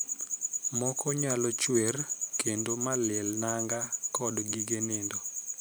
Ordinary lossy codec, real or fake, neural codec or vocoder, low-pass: none; fake; vocoder, 44.1 kHz, 128 mel bands every 512 samples, BigVGAN v2; none